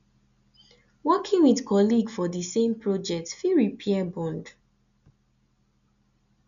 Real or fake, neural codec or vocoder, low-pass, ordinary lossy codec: real; none; 7.2 kHz; none